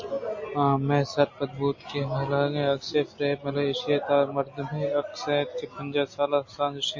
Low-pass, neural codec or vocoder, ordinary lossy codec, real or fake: 7.2 kHz; none; MP3, 32 kbps; real